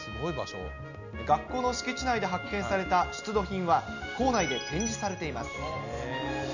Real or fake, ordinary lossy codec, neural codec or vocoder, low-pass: real; none; none; 7.2 kHz